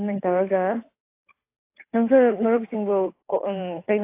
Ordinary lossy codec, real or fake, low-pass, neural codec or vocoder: AAC, 24 kbps; fake; 3.6 kHz; codec, 16 kHz, 8 kbps, FunCodec, trained on Chinese and English, 25 frames a second